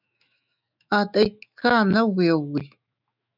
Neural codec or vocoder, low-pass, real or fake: autoencoder, 48 kHz, 128 numbers a frame, DAC-VAE, trained on Japanese speech; 5.4 kHz; fake